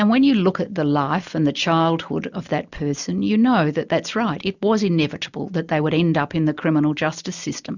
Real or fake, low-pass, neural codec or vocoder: real; 7.2 kHz; none